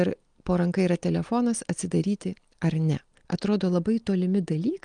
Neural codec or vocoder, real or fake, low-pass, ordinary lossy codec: none; real; 9.9 kHz; Opus, 24 kbps